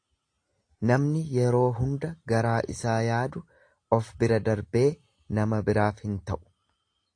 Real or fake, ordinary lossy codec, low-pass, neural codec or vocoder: real; AAC, 48 kbps; 9.9 kHz; none